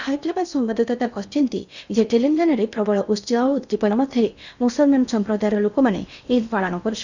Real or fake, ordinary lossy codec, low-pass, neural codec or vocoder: fake; none; 7.2 kHz; codec, 16 kHz in and 24 kHz out, 0.8 kbps, FocalCodec, streaming, 65536 codes